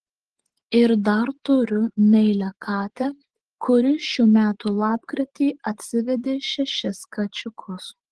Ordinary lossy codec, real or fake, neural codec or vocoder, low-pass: Opus, 16 kbps; fake; vocoder, 24 kHz, 100 mel bands, Vocos; 10.8 kHz